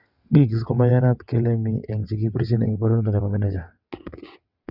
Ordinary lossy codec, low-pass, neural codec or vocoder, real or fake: Opus, 64 kbps; 5.4 kHz; vocoder, 22.05 kHz, 80 mel bands, WaveNeXt; fake